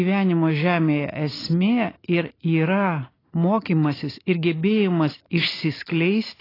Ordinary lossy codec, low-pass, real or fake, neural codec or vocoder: AAC, 24 kbps; 5.4 kHz; real; none